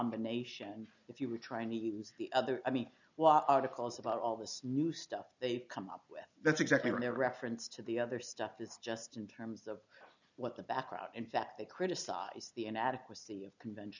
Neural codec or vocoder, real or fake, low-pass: none; real; 7.2 kHz